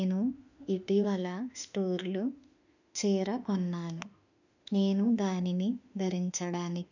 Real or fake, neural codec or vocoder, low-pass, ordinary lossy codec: fake; autoencoder, 48 kHz, 32 numbers a frame, DAC-VAE, trained on Japanese speech; 7.2 kHz; none